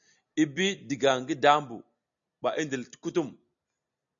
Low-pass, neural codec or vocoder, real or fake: 7.2 kHz; none; real